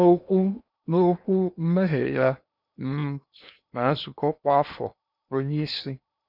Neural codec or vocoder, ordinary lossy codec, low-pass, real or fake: codec, 16 kHz in and 24 kHz out, 0.8 kbps, FocalCodec, streaming, 65536 codes; none; 5.4 kHz; fake